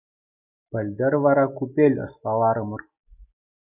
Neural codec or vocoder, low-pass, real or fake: none; 3.6 kHz; real